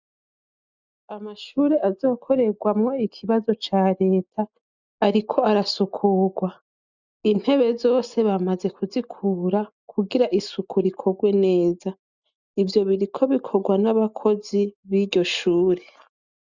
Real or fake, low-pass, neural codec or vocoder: real; 7.2 kHz; none